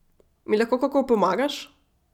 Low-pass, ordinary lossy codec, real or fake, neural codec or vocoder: 19.8 kHz; none; real; none